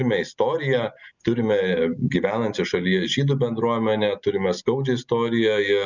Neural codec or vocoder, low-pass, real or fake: none; 7.2 kHz; real